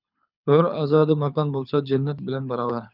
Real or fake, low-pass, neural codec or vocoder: fake; 5.4 kHz; codec, 24 kHz, 6 kbps, HILCodec